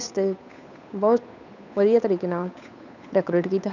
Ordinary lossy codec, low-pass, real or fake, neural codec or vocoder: none; 7.2 kHz; fake; codec, 16 kHz, 8 kbps, FunCodec, trained on Chinese and English, 25 frames a second